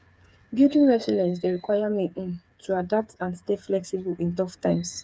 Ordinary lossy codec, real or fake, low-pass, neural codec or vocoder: none; fake; none; codec, 16 kHz, 8 kbps, FreqCodec, smaller model